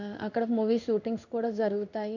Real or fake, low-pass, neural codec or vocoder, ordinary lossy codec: fake; 7.2 kHz; codec, 16 kHz in and 24 kHz out, 1 kbps, XY-Tokenizer; none